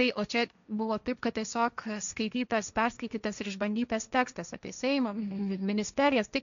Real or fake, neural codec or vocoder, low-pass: fake; codec, 16 kHz, 1.1 kbps, Voila-Tokenizer; 7.2 kHz